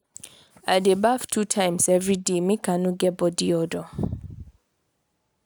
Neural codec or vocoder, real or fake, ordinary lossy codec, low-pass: none; real; none; none